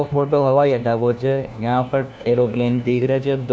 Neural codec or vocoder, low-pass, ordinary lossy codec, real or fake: codec, 16 kHz, 1 kbps, FunCodec, trained on LibriTTS, 50 frames a second; none; none; fake